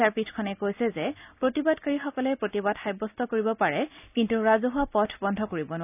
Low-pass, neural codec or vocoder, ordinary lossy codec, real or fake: 3.6 kHz; none; none; real